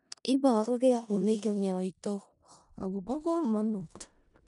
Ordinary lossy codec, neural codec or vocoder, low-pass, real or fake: none; codec, 16 kHz in and 24 kHz out, 0.4 kbps, LongCat-Audio-Codec, four codebook decoder; 10.8 kHz; fake